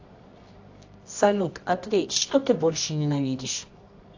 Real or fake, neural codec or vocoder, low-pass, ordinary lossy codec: fake; codec, 24 kHz, 0.9 kbps, WavTokenizer, medium music audio release; 7.2 kHz; AAC, 48 kbps